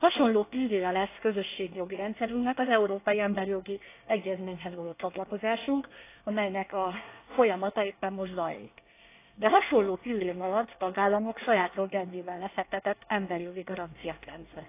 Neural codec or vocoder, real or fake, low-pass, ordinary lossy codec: codec, 24 kHz, 1 kbps, SNAC; fake; 3.6 kHz; AAC, 24 kbps